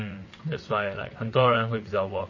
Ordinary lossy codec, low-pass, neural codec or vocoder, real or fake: MP3, 32 kbps; 7.2 kHz; vocoder, 22.05 kHz, 80 mel bands, WaveNeXt; fake